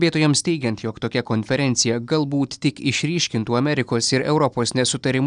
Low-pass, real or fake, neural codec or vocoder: 9.9 kHz; real; none